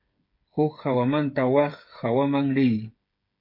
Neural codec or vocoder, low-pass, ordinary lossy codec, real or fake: codec, 16 kHz, 8 kbps, FreqCodec, smaller model; 5.4 kHz; MP3, 32 kbps; fake